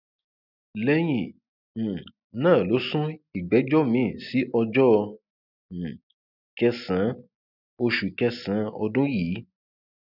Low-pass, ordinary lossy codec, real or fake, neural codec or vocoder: 5.4 kHz; none; real; none